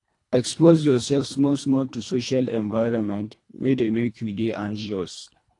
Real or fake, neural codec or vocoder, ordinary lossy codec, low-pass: fake; codec, 24 kHz, 1.5 kbps, HILCodec; AAC, 48 kbps; 10.8 kHz